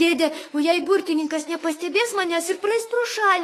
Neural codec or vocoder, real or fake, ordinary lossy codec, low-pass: autoencoder, 48 kHz, 32 numbers a frame, DAC-VAE, trained on Japanese speech; fake; AAC, 48 kbps; 14.4 kHz